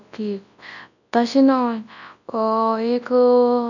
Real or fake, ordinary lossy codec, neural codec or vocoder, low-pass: fake; none; codec, 24 kHz, 0.9 kbps, WavTokenizer, large speech release; 7.2 kHz